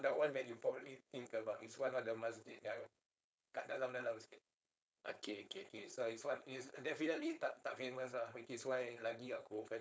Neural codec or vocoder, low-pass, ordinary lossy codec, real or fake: codec, 16 kHz, 4.8 kbps, FACodec; none; none; fake